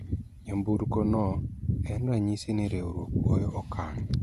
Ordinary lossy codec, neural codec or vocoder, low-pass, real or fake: Opus, 64 kbps; vocoder, 48 kHz, 128 mel bands, Vocos; 14.4 kHz; fake